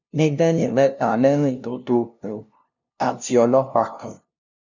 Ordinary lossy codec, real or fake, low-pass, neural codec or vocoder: none; fake; 7.2 kHz; codec, 16 kHz, 0.5 kbps, FunCodec, trained on LibriTTS, 25 frames a second